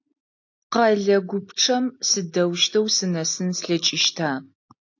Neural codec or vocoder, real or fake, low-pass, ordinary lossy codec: none; real; 7.2 kHz; AAC, 48 kbps